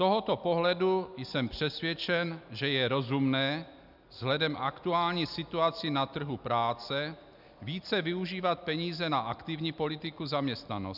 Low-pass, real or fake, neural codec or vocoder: 5.4 kHz; real; none